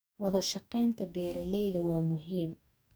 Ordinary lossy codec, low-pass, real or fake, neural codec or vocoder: none; none; fake; codec, 44.1 kHz, 2.6 kbps, DAC